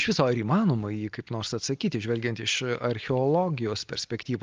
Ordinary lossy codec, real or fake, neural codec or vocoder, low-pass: Opus, 32 kbps; real; none; 7.2 kHz